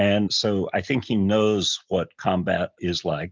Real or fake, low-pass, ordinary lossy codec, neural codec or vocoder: real; 7.2 kHz; Opus, 24 kbps; none